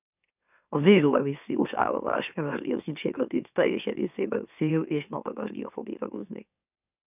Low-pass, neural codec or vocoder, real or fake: 3.6 kHz; autoencoder, 44.1 kHz, a latent of 192 numbers a frame, MeloTTS; fake